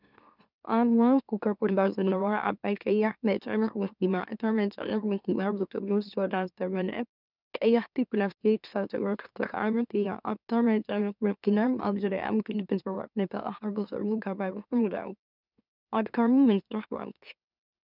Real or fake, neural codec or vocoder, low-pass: fake; autoencoder, 44.1 kHz, a latent of 192 numbers a frame, MeloTTS; 5.4 kHz